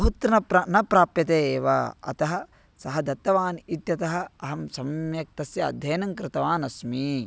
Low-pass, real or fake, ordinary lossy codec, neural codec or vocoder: none; real; none; none